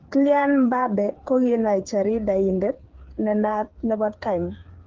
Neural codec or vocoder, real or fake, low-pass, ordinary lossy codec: codec, 16 kHz, 8 kbps, FreqCodec, smaller model; fake; 7.2 kHz; Opus, 16 kbps